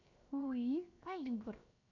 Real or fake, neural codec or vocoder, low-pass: fake; codec, 16 kHz, 0.7 kbps, FocalCodec; 7.2 kHz